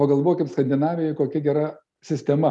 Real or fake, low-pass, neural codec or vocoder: real; 10.8 kHz; none